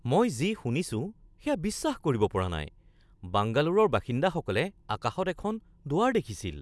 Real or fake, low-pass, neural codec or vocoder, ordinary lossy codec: real; none; none; none